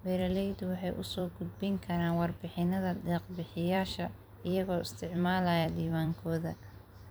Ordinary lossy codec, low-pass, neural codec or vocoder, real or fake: none; none; vocoder, 44.1 kHz, 128 mel bands every 256 samples, BigVGAN v2; fake